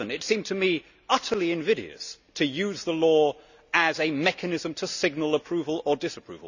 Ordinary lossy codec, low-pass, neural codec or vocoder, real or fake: none; 7.2 kHz; none; real